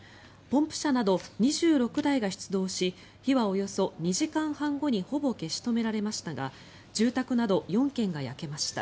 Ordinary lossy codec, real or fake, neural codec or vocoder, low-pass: none; real; none; none